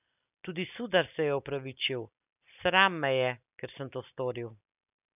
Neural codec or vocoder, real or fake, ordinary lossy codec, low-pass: none; real; none; 3.6 kHz